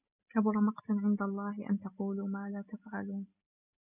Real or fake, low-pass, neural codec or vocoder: real; 3.6 kHz; none